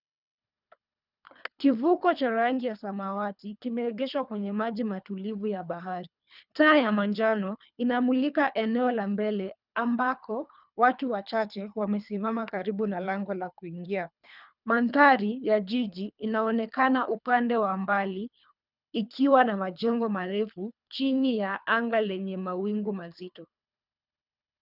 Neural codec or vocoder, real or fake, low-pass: codec, 24 kHz, 3 kbps, HILCodec; fake; 5.4 kHz